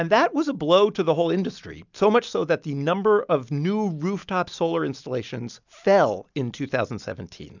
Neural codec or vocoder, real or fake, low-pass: none; real; 7.2 kHz